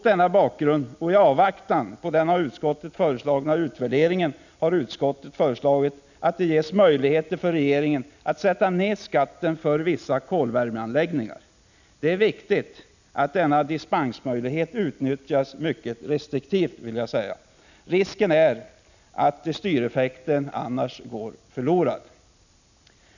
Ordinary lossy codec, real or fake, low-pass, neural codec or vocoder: none; real; 7.2 kHz; none